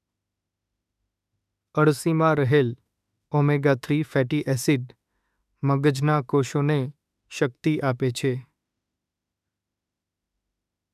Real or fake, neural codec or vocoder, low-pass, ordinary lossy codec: fake; autoencoder, 48 kHz, 32 numbers a frame, DAC-VAE, trained on Japanese speech; 14.4 kHz; none